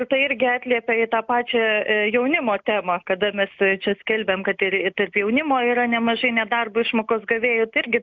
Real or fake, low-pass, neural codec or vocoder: real; 7.2 kHz; none